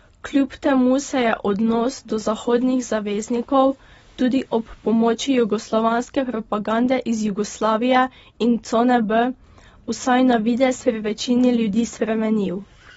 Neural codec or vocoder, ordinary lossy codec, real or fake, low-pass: none; AAC, 24 kbps; real; 19.8 kHz